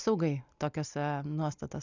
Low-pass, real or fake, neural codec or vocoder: 7.2 kHz; real; none